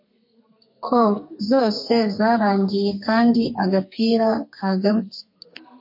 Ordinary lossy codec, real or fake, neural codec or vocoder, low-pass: MP3, 32 kbps; fake; codec, 32 kHz, 1.9 kbps, SNAC; 5.4 kHz